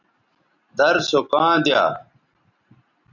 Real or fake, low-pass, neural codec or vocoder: real; 7.2 kHz; none